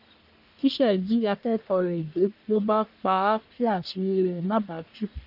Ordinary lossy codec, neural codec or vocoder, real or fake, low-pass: none; codec, 44.1 kHz, 1.7 kbps, Pupu-Codec; fake; 5.4 kHz